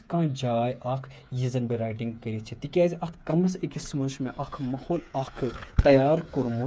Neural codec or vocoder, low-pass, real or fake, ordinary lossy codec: codec, 16 kHz, 8 kbps, FreqCodec, smaller model; none; fake; none